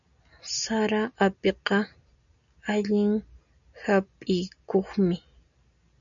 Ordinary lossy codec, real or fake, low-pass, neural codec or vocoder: MP3, 96 kbps; real; 7.2 kHz; none